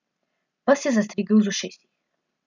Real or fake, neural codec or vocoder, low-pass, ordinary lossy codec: real; none; 7.2 kHz; none